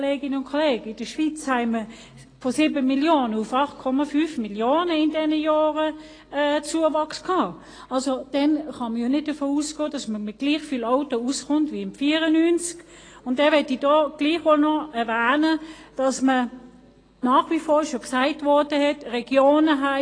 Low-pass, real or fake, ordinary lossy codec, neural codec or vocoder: 9.9 kHz; real; AAC, 32 kbps; none